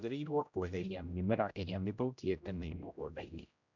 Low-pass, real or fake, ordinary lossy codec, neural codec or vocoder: 7.2 kHz; fake; none; codec, 16 kHz, 0.5 kbps, X-Codec, HuBERT features, trained on general audio